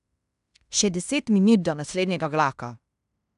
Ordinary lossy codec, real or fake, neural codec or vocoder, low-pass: none; fake; codec, 16 kHz in and 24 kHz out, 0.9 kbps, LongCat-Audio-Codec, fine tuned four codebook decoder; 10.8 kHz